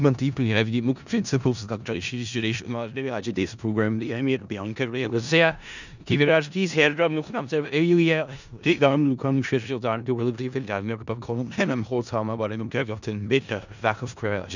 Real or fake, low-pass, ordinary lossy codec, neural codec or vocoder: fake; 7.2 kHz; none; codec, 16 kHz in and 24 kHz out, 0.4 kbps, LongCat-Audio-Codec, four codebook decoder